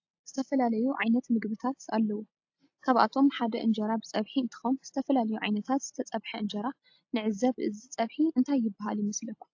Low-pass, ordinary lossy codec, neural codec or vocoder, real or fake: 7.2 kHz; AAC, 48 kbps; none; real